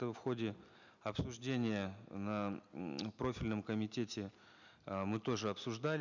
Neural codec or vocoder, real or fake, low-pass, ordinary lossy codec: none; real; 7.2 kHz; none